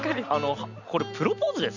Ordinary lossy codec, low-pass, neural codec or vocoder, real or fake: none; 7.2 kHz; none; real